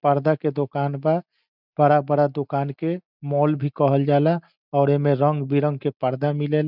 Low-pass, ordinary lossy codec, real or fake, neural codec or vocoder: 5.4 kHz; none; real; none